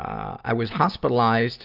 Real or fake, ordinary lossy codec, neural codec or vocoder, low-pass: real; Opus, 32 kbps; none; 5.4 kHz